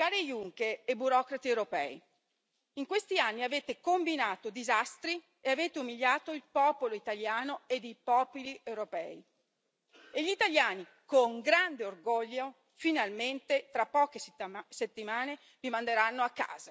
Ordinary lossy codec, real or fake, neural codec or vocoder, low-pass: none; real; none; none